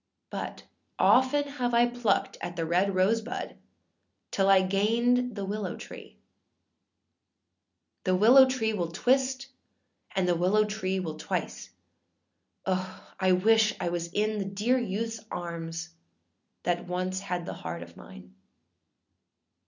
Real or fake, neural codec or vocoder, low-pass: real; none; 7.2 kHz